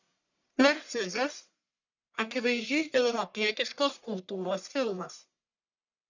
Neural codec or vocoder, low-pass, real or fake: codec, 44.1 kHz, 1.7 kbps, Pupu-Codec; 7.2 kHz; fake